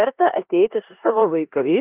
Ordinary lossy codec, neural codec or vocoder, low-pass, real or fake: Opus, 24 kbps; codec, 16 kHz in and 24 kHz out, 0.9 kbps, LongCat-Audio-Codec, four codebook decoder; 3.6 kHz; fake